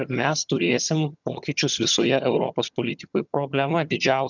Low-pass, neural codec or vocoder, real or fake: 7.2 kHz; vocoder, 22.05 kHz, 80 mel bands, HiFi-GAN; fake